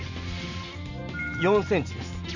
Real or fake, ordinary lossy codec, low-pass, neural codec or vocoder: real; none; 7.2 kHz; none